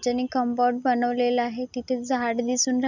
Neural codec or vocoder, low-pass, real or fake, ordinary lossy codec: none; 7.2 kHz; real; none